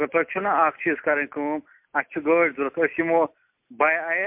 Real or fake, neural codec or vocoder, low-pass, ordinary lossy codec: real; none; 3.6 kHz; MP3, 32 kbps